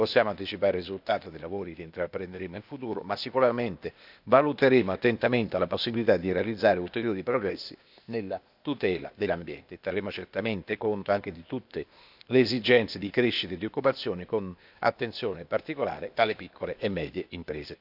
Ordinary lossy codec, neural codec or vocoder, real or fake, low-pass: none; codec, 16 kHz, 0.8 kbps, ZipCodec; fake; 5.4 kHz